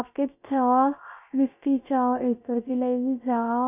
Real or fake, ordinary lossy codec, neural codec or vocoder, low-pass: fake; none; codec, 16 kHz, 0.3 kbps, FocalCodec; 3.6 kHz